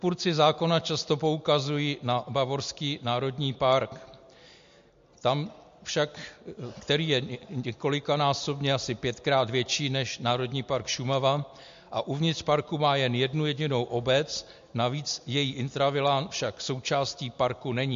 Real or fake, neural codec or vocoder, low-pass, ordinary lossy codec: real; none; 7.2 kHz; MP3, 48 kbps